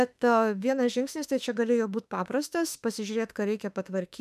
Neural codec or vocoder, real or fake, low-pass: autoencoder, 48 kHz, 32 numbers a frame, DAC-VAE, trained on Japanese speech; fake; 14.4 kHz